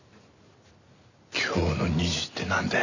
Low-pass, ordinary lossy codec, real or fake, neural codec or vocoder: 7.2 kHz; none; real; none